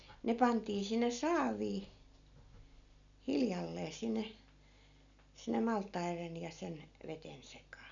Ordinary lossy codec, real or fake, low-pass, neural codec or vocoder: none; real; 7.2 kHz; none